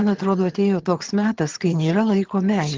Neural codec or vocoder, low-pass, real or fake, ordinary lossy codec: vocoder, 22.05 kHz, 80 mel bands, HiFi-GAN; 7.2 kHz; fake; Opus, 16 kbps